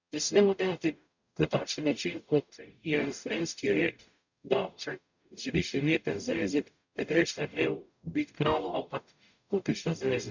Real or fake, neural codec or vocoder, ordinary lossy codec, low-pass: fake; codec, 44.1 kHz, 0.9 kbps, DAC; none; 7.2 kHz